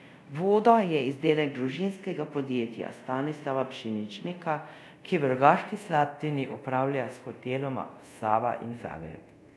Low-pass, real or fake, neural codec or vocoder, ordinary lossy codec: none; fake; codec, 24 kHz, 0.5 kbps, DualCodec; none